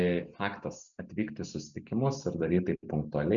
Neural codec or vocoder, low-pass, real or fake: none; 7.2 kHz; real